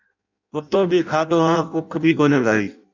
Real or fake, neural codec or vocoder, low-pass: fake; codec, 16 kHz in and 24 kHz out, 0.6 kbps, FireRedTTS-2 codec; 7.2 kHz